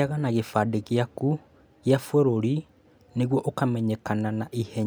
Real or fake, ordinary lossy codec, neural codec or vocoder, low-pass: real; none; none; none